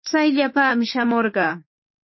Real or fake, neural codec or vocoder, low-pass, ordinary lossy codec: fake; autoencoder, 48 kHz, 32 numbers a frame, DAC-VAE, trained on Japanese speech; 7.2 kHz; MP3, 24 kbps